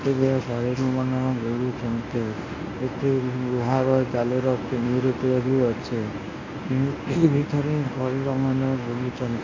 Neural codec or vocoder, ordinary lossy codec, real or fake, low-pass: codec, 24 kHz, 0.9 kbps, WavTokenizer, medium speech release version 1; AAC, 32 kbps; fake; 7.2 kHz